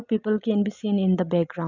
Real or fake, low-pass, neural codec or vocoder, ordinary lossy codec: real; 7.2 kHz; none; none